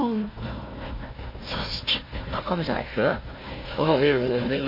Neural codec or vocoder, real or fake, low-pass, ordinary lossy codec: codec, 16 kHz, 1 kbps, FunCodec, trained on Chinese and English, 50 frames a second; fake; 5.4 kHz; MP3, 24 kbps